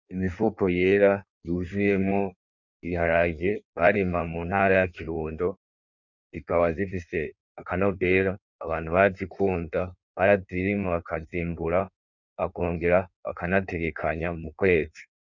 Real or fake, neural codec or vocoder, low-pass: fake; codec, 16 kHz in and 24 kHz out, 1.1 kbps, FireRedTTS-2 codec; 7.2 kHz